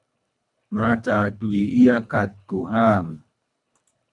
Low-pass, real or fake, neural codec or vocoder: 10.8 kHz; fake; codec, 24 kHz, 1.5 kbps, HILCodec